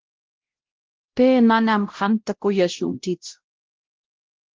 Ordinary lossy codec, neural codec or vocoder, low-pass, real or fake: Opus, 16 kbps; codec, 16 kHz, 0.5 kbps, X-Codec, WavLM features, trained on Multilingual LibriSpeech; 7.2 kHz; fake